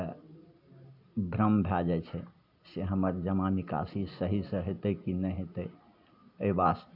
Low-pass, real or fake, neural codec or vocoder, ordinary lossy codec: 5.4 kHz; real; none; AAC, 48 kbps